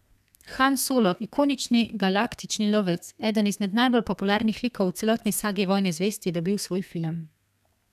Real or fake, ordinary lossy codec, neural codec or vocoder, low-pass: fake; none; codec, 32 kHz, 1.9 kbps, SNAC; 14.4 kHz